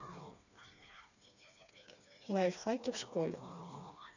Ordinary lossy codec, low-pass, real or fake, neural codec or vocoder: none; 7.2 kHz; fake; codec, 16 kHz, 2 kbps, FreqCodec, smaller model